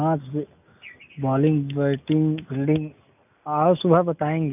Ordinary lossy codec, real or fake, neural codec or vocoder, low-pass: none; real; none; 3.6 kHz